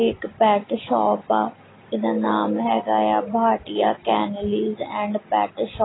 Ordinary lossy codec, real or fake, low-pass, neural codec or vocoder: AAC, 16 kbps; real; 7.2 kHz; none